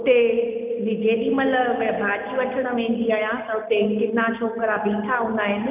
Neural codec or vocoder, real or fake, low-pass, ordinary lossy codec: none; real; 3.6 kHz; none